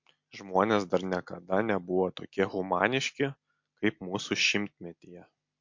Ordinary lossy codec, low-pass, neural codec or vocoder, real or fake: MP3, 48 kbps; 7.2 kHz; none; real